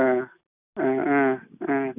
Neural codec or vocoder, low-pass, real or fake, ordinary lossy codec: none; 3.6 kHz; real; none